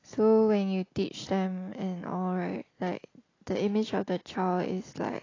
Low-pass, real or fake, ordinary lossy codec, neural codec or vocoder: 7.2 kHz; real; AAC, 32 kbps; none